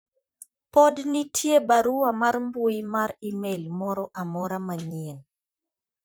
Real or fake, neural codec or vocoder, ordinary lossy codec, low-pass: fake; vocoder, 44.1 kHz, 128 mel bands, Pupu-Vocoder; none; none